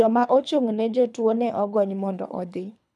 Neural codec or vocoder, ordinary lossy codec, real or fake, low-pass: codec, 24 kHz, 3 kbps, HILCodec; none; fake; none